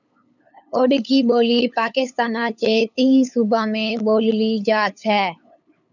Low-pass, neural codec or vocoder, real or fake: 7.2 kHz; codec, 16 kHz, 8 kbps, FunCodec, trained on LibriTTS, 25 frames a second; fake